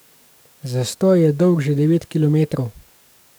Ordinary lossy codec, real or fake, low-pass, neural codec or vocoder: none; fake; none; codec, 44.1 kHz, 7.8 kbps, DAC